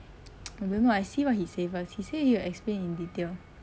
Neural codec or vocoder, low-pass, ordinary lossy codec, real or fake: none; none; none; real